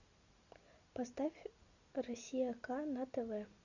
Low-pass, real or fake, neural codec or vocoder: 7.2 kHz; real; none